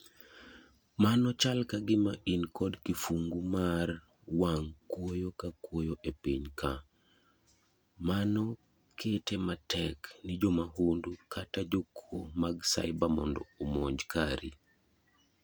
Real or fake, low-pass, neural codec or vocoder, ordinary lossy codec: real; none; none; none